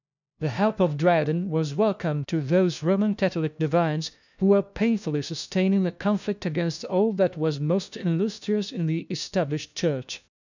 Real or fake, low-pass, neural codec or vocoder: fake; 7.2 kHz; codec, 16 kHz, 1 kbps, FunCodec, trained on LibriTTS, 50 frames a second